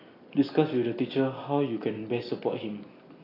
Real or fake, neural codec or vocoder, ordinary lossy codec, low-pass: real; none; AAC, 24 kbps; 5.4 kHz